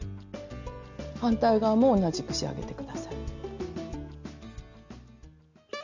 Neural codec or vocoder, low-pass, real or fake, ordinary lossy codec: none; 7.2 kHz; real; none